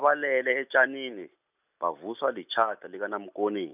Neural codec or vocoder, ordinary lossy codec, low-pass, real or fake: none; none; 3.6 kHz; real